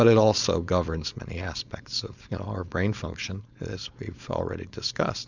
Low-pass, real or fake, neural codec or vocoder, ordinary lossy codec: 7.2 kHz; fake; codec, 16 kHz, 4.8 kbps, FACodec; Opus, 64 kbps